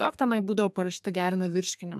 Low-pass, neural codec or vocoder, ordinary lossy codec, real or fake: 14.4 kHz; codec, 32 kHz, 1.9 kbps, SNAC; AAC, 96 kbps; fake